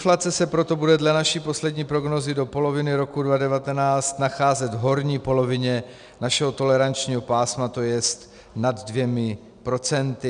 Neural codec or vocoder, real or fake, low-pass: none; real; 9.9 kHz